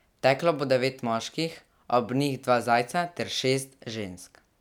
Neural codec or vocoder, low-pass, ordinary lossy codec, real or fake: none; 19.8 kHz; none; real